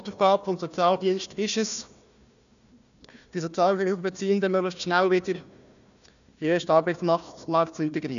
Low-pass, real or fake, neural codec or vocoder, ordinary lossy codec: 7.2 kHz; fake; codec, 16 kHz, 1 kbps, FunCodec, trained on Chinese and English, 50 frames a second; none